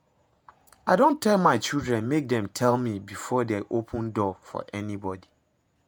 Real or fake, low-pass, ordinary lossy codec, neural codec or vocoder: fake; none; none; vocoder, 48 kHz, 128 mel bands, Vocos